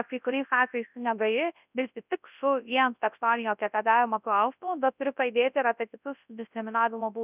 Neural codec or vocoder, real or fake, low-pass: codec, 24 kHz, 0.9 kbps, WavTokenizer, large speech release; fake; 3.6 kHz